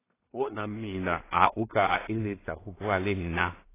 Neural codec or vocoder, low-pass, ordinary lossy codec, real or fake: codec, 16 kHz in and 24 kHz out, 0.4 kbps, LongCat-Audio-Codec, two codebook decoder; 3.6 kHz; AAC, 16 kbps; fake